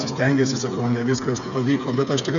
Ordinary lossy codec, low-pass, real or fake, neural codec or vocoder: MP3, 96 kbps; 7.2 kHz; fake; codec, 16 kHz, 8 kbps, FreqCodec, smaller model